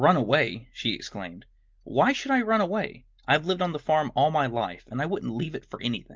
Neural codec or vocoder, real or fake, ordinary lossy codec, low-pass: none; real; Opus, 32 kbps; 7.2 kHz